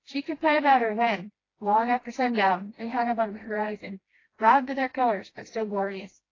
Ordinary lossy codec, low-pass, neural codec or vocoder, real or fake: AAC, 32 kbps; 7.2 kHz; codec, 16 kHz, 1 kbps, FreqCodec, smaller model; fake